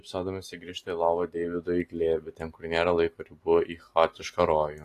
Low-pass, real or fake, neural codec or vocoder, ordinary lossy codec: 14.4 kHz; real; none; AAC, 48 kbps